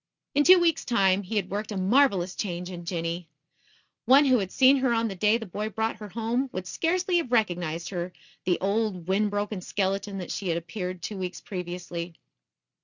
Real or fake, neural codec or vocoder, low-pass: real; none; 7.2 kHz